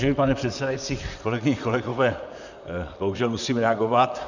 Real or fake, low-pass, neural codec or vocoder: fake; 7.2 kHz; vocoder, 44.1 kHz, 128 mel bands, Pupu-Vocoder